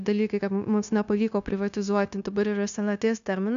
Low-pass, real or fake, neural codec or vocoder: 7.2 kHz; fake; codec, 16 kHz, 0.9 kbps, LongCat-Audio-Codec